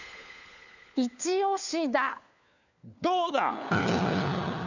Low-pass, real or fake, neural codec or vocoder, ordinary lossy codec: 7.2 kHz; fake; codec, 16 kHz, 4 kbps, FunCodec, trained on LibriTTS, 50 frames a second; none